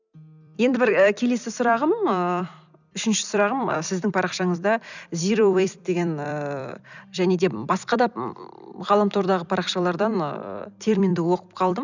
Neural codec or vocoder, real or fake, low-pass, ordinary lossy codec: vocoder, 44.1 kHz, 128 mel bands every 256 samples, BigVGAN v2; fake; 7.2 kHz; none